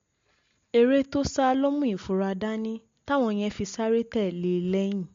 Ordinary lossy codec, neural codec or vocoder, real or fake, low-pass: MP3, 48 kbps; none; real; 7.2 kHz